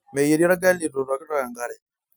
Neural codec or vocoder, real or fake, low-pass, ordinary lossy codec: none; real; none; none